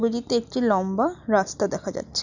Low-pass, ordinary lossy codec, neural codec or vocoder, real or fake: 7.2 kHz; none; none; real